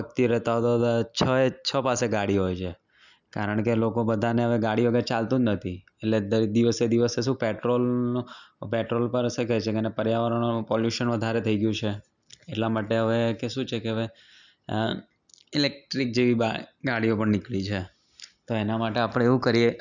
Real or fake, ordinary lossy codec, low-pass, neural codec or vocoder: real; none; 7.2 kHz; none